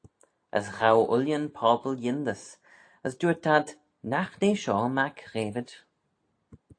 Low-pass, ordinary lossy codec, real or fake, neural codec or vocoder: 9.9 kHz; AAC, 48 kbps; real; none